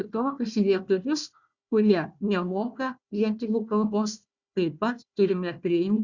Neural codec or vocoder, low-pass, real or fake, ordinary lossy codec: codec, 16 kHz, 1 kbps, FunCodec, trained on Chinese and English, 50 frames a second; 7.2 kHz; fake; Opus, 64 kbps